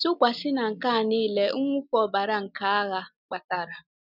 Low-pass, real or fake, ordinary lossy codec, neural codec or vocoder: 5.4 kHz; real; none; none